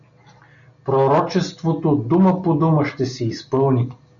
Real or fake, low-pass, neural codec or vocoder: real; 7.2 kHz; none